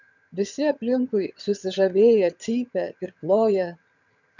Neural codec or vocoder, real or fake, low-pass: vocoder, 22.05 kHz, 80 mel bands, HiFi-GAN; fake; 7.2 kHz